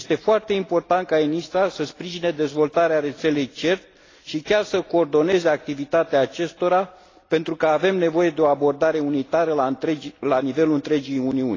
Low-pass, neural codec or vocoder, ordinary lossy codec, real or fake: 7.2 kHz; none; AAC, 32 kbps; real